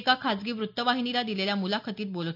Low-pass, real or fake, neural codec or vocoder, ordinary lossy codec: 5.4 kHz; real; none; none